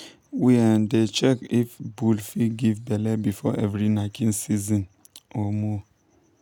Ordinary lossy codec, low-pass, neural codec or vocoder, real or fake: none; 19.8 kHz; none; real